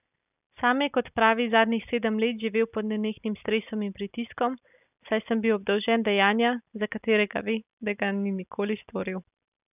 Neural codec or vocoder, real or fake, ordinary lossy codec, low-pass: none; real; none; 3.6 kHz